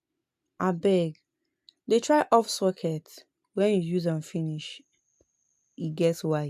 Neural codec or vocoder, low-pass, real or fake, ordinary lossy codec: none; 14.4 kHz; real; AAC, 96 kbps